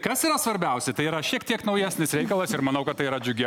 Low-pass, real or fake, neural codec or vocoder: 19.8 kHz; real; none